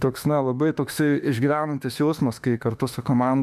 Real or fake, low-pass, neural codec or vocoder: fake; 14.4 kHz; autoencoder, 48 kHz, 32 numbers a frame, DAC-VAE, trained on Japanese speech